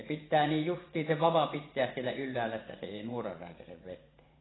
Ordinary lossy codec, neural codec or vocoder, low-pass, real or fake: AAC, 16 kbps; vocoder, 24 kHz, 100 mel bands, Vocos; 7.2 kHz; fake